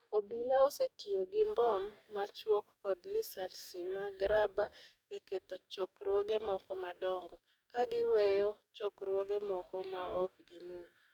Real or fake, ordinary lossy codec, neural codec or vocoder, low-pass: fake; none; codec, 44.1 kHz, 2.6 kbps, DAC; 19.8 kHz